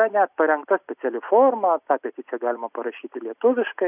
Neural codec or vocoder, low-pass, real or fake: none; 3.6 kHz; real